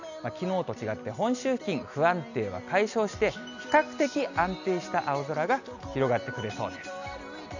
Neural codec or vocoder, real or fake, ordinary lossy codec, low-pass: none; real; none; 7.2 kHz